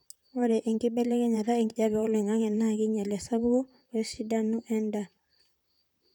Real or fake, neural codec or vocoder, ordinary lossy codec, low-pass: fake; vocoder, 44.1 kHz, 128 mel bands, Pupu-Vocoder; none; 19.8 kHz